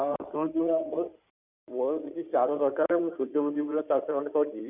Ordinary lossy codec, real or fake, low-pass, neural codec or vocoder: none; fake; 3.6 kHz; codec, 16 kHz in and 24 kHz out, 2.2 kbps, FireRedTTS-2 codec